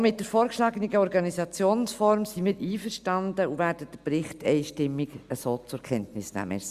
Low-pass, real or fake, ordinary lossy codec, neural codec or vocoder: 14.4 kHz; real; Opus, 64 kbps; none